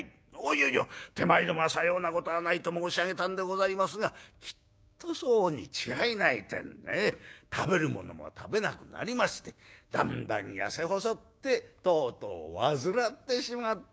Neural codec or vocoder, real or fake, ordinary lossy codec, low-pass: codec, 16 kHz, 6 kbps, DAC; fake; none; none